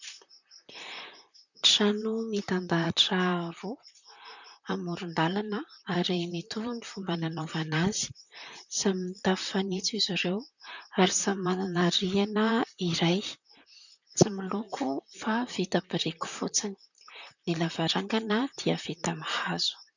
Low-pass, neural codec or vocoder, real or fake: 7.2 kHz; vocoder, 44.1 kHz, 128 mel bands, Pupu-Vocoder; fake